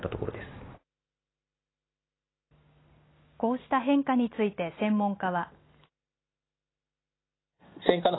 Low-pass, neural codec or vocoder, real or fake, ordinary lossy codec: 7.2 kHz; none; real; AAC, 16 kbps